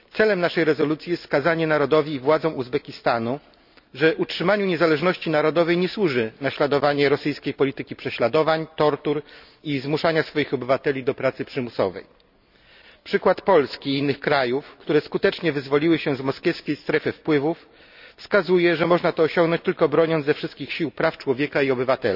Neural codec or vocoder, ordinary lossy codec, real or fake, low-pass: none; none; real; 5.4 kHz